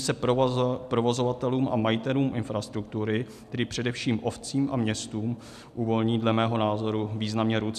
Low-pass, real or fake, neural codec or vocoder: 14.4 kHz; real; none